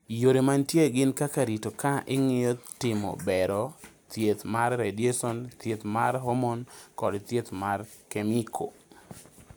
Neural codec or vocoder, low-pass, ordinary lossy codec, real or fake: none; none; none; real